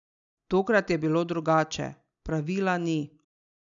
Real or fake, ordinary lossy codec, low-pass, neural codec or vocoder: real; none; 7.2 kHz; none